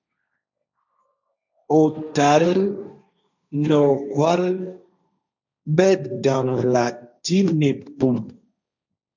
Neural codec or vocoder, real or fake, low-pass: codec, 16 kHz, 1.1 kbps, Voila-Tokenizer; fake; 7.2 kHz